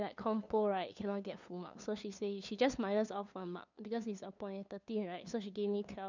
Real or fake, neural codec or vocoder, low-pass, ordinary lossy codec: fake; codec, 16 kHz, 2 kbps, FunCodec, trained on LibriTTS, 25 frames a second; 7.2 kHz; none